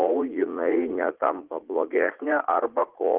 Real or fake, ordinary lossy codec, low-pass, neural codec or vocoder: fake; Opus, 16 kbps; 3.6 kHz; vocoder, 44.1 kHz, 80 mel bands, Vocos